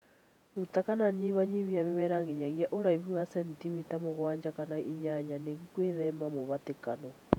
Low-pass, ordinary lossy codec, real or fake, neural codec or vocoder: 19.8 kHz; none; fake; vocoder, 48 kHz, 128 mel bands, Vocos